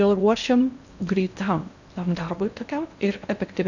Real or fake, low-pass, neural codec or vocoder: fake; 7.2 kHz; codec, 16 kHz in and 24 kHz out, 0.8 kbps, FocalCodec, streaming, 65536 codes